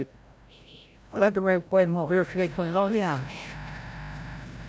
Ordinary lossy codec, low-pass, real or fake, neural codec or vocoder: none; none; fake; codec, 16 kHz, 0.5 kbps, FreqCodec, larger model